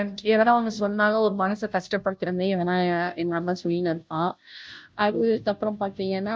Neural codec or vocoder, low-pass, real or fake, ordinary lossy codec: codec, 16 kHz, 0.5 kbps, FunCodec, trained on Chinese and English, 25 frames a second; none; fake; none